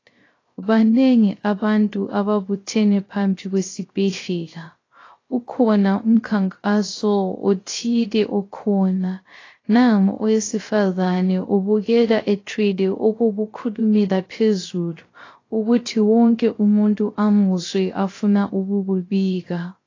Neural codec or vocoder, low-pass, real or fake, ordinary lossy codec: codec, 16 kHz, 0.3 kbps, FocalCodec; 7.2 kHz; fake; AAC, 32 kbps